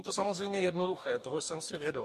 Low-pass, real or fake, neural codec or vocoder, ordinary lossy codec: 14.4 kHz; fake; codec, 44.1 kHz, 2.6 kbps, DAC; MP3, 64 kbps